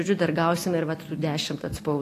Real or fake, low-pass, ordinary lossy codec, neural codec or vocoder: real; 14.4 kHz; AAC, 48 kbps; none